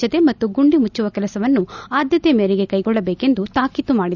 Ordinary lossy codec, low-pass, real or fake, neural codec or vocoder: none; 7.2 kHz; real; none